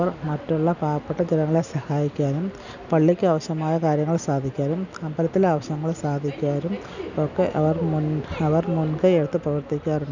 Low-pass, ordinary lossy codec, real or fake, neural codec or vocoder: 7.2 kHz; none; real; none